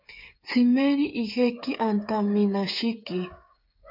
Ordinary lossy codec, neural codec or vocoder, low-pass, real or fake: MP3, 48 kbps; codec, 16 kHz, 8 kbps, FreqCodec, smaller model; 5.4 kHz; fake